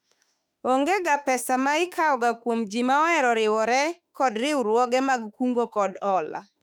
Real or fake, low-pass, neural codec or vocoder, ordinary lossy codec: fake; 19.8 kHz; autoencoder, 48 kHz, 32 numbers a frame, DAC-VAE, trained on Japanese speech; none